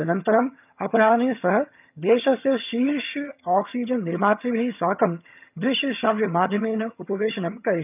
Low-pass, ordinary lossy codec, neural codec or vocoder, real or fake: 3.6 kHz; none; vocoder, 22.05 kHz, 80 mel bands, HiFi-GAN; fake